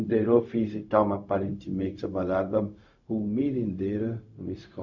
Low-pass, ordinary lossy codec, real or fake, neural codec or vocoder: 7.2 kHz; none; fake; codec, 16 kHz, 0.4 kbps, LongCat-Audio-Codec